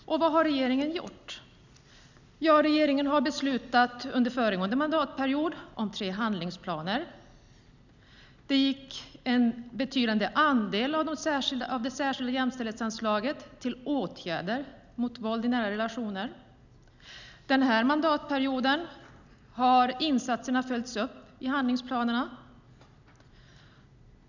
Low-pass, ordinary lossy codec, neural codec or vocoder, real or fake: 7.2 kHz; none; none; real